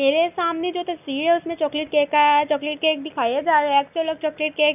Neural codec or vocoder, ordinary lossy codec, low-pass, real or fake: none; none; 3.6 kHz; real